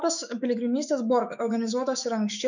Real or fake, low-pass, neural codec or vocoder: fake; 7.2 kHz; autoencoder, 48 kHz, 128 numbers a frame, DAC-VAE, trained on Japanese speech